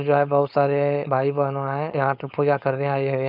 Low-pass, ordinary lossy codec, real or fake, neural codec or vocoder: 5.4 kHz; none; fake; codec, 16 kHz, 4.8 kbps, FACodec